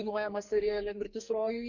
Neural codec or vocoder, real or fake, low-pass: codec, 44.1 kHz, 2.6 kbps, SNAC; fake; 7.2 kHz